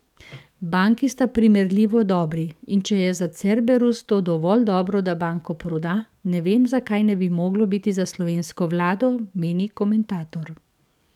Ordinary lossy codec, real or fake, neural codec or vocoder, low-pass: none; fake; codec, 44.1 kHz, 7.8 kbps, DAC; 19.8 kHz